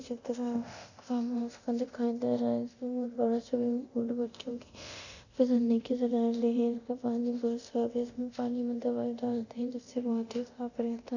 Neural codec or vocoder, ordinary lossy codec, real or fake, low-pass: codec, 24 kHz, 0.9 kbps, DualCodec; none; fake; 7.2 kHz